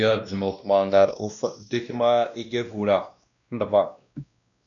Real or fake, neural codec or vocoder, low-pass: fake; codec, 16 kHz, 1 kbps, X-Codec, WavLM features, trained on Multilingual LibriSpeech; 7.2 kHz